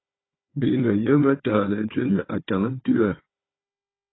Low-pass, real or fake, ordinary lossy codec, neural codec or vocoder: 7.2 kHz; fake; AAC, 16 kbps; codec, 16 kHz, 4 kbps, FunCodec, trained on Chinese and English, 50 frames a second